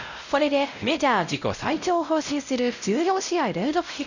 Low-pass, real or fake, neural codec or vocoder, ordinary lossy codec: 7.2 kHz; fake; codec, 16 kHz, 0.5 kbps, X-Codec, WavLM features, trained on Multilingual LibriSpeech; none